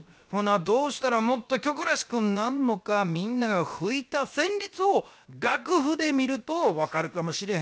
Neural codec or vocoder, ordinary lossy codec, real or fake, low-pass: codec, 16 kHz, 0.7 kbps, FocalCodec; none; fake; none